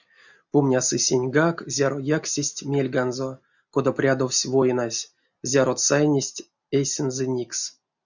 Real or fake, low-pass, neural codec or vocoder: real; 7.2 kHz; none